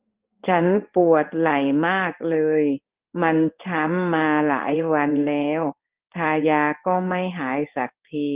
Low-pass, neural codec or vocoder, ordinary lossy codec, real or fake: 3.6 kHz; codec, 16 kHz in and 24 kHz out, 1 kbps, XY-Tokenizer; Opus, 32 kbps; fake